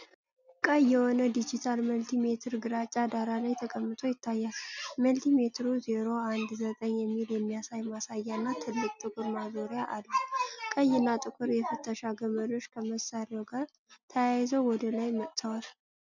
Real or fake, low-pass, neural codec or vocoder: real; 7.2 kHz; none